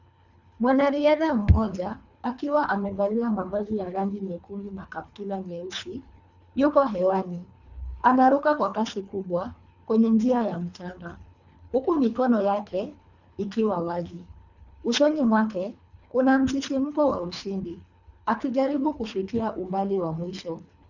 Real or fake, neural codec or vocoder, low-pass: fake; codec, 24 kHz, 3 kbps, HILCodec; 7.2 kHz